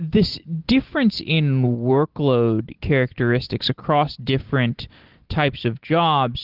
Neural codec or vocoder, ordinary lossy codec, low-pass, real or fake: none; Opus, 16 kbps; 5.4 kHz; real